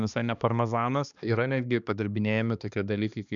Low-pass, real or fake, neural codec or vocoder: 7.2 kHz; fake; codec, 16 kHz, 2 kbps, X-Codec, HuBERT features, trained on balanced general audio